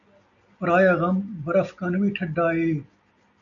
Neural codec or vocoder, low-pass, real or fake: none; 7.2 kHz; real